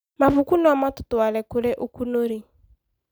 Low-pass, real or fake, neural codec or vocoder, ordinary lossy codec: none; real; none; none